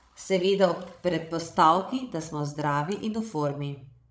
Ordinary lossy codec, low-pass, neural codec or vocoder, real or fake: none; none; codec, 16 kHz, 16 kbps, FreqCodec, larger model; fake